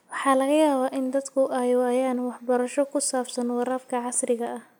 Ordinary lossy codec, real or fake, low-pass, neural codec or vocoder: none; real; none; none